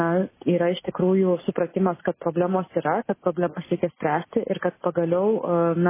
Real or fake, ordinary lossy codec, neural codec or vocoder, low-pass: real; MP3, 16 kbps; none; 3.6 kHz